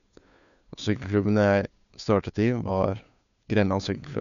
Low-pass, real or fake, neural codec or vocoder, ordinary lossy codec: 7.2 kHz; fake; codec, 16 kHz, 2 kbps, FunCodec, trained on Chinese and English, 25 frames a second; none